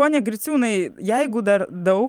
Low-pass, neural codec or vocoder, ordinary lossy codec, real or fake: 19.8 kHz; vocoder, 44.1 kHz, 128 mel bands every 512 samples, BigVGAN v2; Opus, 32 kbps; fake